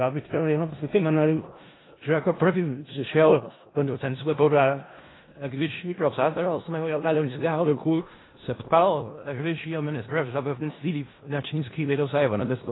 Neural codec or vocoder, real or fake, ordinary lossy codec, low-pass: codec, 16 kHz in and 24 kHz out, 0.4 kbps, LongCat-Audio-Codec, four codebook decoder; fake; AAC, 16 kbps; 7.2 kHz